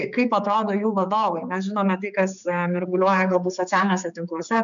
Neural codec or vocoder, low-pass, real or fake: codec, 16 kHz, 4 kbps, X-Codec, HuBERT features, trained on general audio; 7.2 kHz; fake